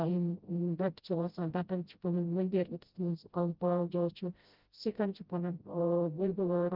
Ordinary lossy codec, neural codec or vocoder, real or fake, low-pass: Opus, 32 kbps; codec, 16 kHz, 0.5 kbps, FreqCodec, smaller model; fake; 5.4 kHz